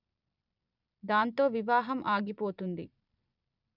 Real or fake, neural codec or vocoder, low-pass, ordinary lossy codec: fake; vocoder, 44.1 kHz, 128 mel bands every 256 samples, BigVGAN v2; 5.4 kHz; none